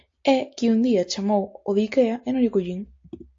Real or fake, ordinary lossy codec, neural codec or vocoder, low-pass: real; AAC, 48 kbps; none; 7.2 kHz